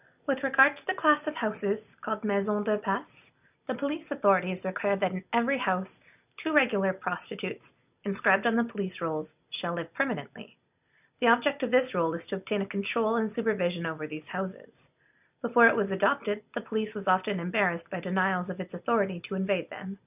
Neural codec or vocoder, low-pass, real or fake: none; 3.6 kHz; real